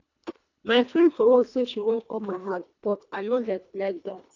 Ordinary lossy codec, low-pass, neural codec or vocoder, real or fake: none; 7.2 kHz; codec, 24 kHz, 1.5 kbps, HILCodec; fake